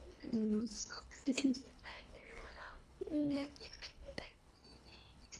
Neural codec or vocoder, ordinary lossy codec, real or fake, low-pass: codec, 24 kHz, 1.5 kbps, HILCodec; none; fake; none